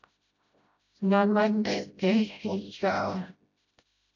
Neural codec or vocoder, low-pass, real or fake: codec, 16 kHz, 0.5 kbps, FreqCodec, smaller model; 7.2 kHz; fake